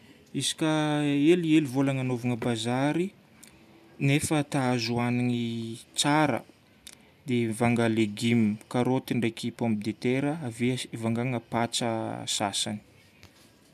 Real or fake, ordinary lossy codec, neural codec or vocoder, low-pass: real; none; none; 14.4 kHz